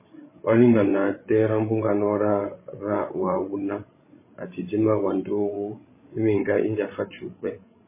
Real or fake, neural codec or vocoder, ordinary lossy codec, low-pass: fake; codec, 16 kHz, 16 kbps, FreqCodec, larger model; MP3, 16 kbps; 3.6 kHz